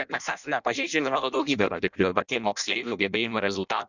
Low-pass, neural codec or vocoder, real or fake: 7.2 kHz; codec, 16 kHz in and 24 kHz out, 0.6 kbps, FireRedTTS-2 codec; fake